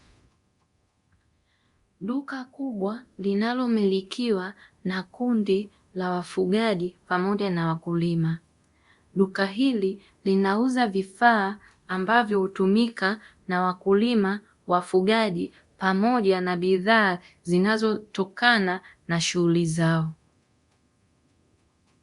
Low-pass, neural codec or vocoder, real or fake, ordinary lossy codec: 10.8 kHz; codec, 24 kHz, 0.9 kbps, DualCodec; fake; Opus, 64 kbps